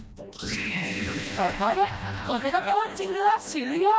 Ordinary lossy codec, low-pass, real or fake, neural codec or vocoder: none; none; fake; codec, 16 kHz, 1 kbps, FreqCodec, smaller model